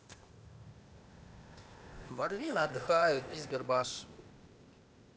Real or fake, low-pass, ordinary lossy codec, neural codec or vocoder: fake; none; none; codec, 16 kHz, 0.8 kbps, ZipCodec